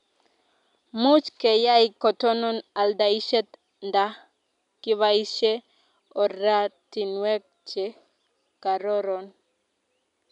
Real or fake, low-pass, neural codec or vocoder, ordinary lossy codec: real; 10.8 kHz; none; none